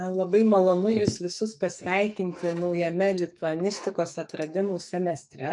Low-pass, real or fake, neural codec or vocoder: 10.8 kHz; fake; codec, 44.1 kHz, 2.6 kbps, SNAC